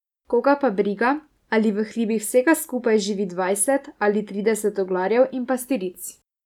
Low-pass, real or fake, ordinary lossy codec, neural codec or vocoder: 19.8 kHz; real; none; none